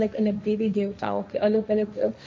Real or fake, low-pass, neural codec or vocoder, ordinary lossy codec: fake; none; codec, 16 kHz, 1.1 kbps, Voila-Tokenizer; none